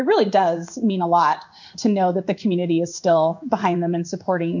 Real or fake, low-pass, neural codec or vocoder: fake; 7.2 kHz; codec, 16 kHz in and 24 kHz out, 1 kbps, XY-Tokenizer